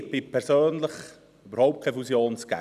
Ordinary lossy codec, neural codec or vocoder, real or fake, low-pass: none; none; real; 14.4 kHz